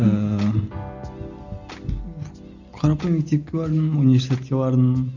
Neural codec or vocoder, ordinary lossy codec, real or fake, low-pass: none; none; real; 7.2 kHz